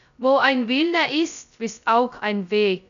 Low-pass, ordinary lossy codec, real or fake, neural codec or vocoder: 7.2 kHz; none; fake; codec, 16 kHz, 0.2 kbps, FocalCodec